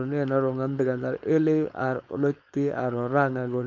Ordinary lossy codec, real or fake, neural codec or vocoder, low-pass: MP3, 64 kbps; fake; codec, 16 kHz, 4.8 kbps, FACodec; 7.2 kHz